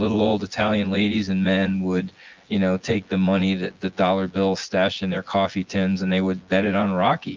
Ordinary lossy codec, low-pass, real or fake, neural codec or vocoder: Opus, 32 kbps; 7.2 kHz; fake; vocoder, 24 kHz, 100 mel bands, Vocos